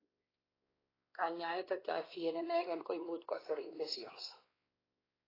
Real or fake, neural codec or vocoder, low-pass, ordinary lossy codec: fake; codec, 16 kHz, 2 kbps, X-Codec, WavLM features, trained on Multilingual LibriSpeech; 5.4 kHz; AAC, 24 kbps